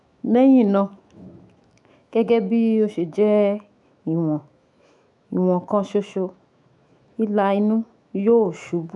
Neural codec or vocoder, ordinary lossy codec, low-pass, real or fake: autoencoder, 48 kHz, 128 numbers a frame, DAC-VAE, trained on Japanese speech; none; 10.8 kHz; fake